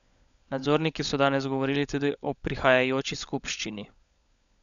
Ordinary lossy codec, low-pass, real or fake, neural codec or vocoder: none; 7.2 kHz; fake; codec, 16 kHz, 16 kbps, FunCodec, trained on LibriTTS, 50 frames a second